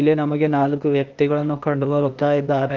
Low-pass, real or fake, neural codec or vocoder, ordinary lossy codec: 7.2 kHz; fake; codec, 16 kHz, 0.8 kbps, ZipCodec; Opus, 24 kbps